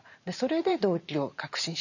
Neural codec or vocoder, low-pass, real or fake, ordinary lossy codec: vocoder, 44.1 kHz, 128 mel bands every 512 samples, BigVGAN v2; 7.2 kHz; fake; none